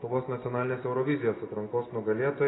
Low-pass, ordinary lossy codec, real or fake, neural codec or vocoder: 7.2 kHz; AAC, 16 kbps; real; none